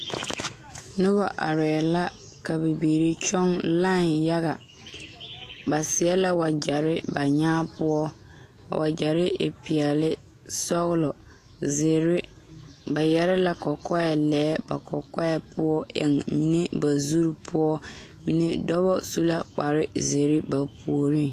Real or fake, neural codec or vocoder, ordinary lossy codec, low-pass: fake; autoencoder, 48 kHz, 128 numbers a frame, DAC-VAE, trained on Japanese speech; AAC, 48 kbps; 14.4 kHz